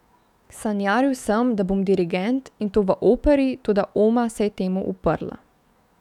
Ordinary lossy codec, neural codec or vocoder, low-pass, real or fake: none; autoencoder, 48 kHz, 128 numbers a frame, DAC-VAE, trained on Japanese speech; 19.8 kHz; fake